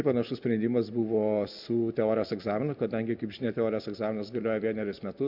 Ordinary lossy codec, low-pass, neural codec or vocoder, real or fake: MP3, 48 kbps; 5.4 kHz; none; real